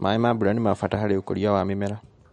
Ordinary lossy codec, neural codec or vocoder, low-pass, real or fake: MP3, 48 kbps; none; 19.8 kHz; real